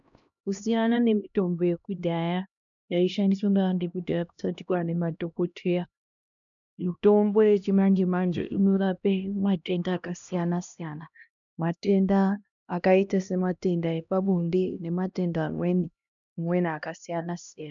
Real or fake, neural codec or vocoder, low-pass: fake; codec, 16 kHz, 1 kbps, X-Codec, HuBERT features, trained on LibriSpeech; 7.2 kHz